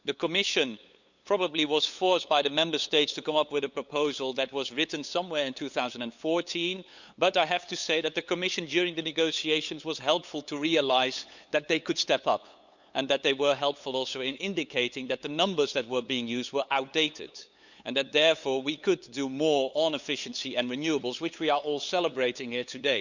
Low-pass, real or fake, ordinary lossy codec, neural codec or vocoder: 7.2 kHz; fake; none; codec, 16 kHz, 8 kbps, FunCodec, trained on LibriTTS, 25 frames a second